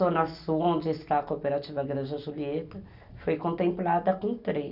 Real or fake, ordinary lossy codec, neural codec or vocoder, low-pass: real; none; none; 5.4 kHz